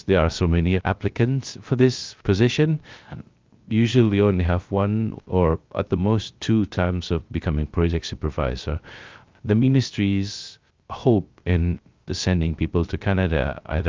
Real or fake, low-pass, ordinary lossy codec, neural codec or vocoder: fake; 7.2 kHz; Opus, 24 kbps; codec, 16 kHz, 0.3 kbps, FocalCodec